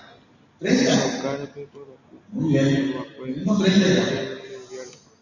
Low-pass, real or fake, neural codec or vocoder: 7.2 kHz; real; none